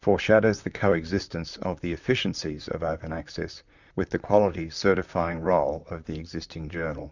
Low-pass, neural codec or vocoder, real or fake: 7.2 kHz; vocoder, 44.1 kHz, 128 mel bands, Pupu-Vocoder; fake